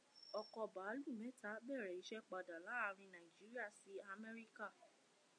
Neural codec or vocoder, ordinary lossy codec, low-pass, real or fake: none; MP3, 64 kbps; 9.9 kHz; real